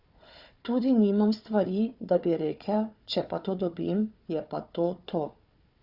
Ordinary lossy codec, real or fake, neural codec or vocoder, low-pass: Opus, 64 kbps; fake; codec, 16 kHz, 4 kbps, FunCodec, trained on Chinese and English, 50 frames a second; 5.4 kHz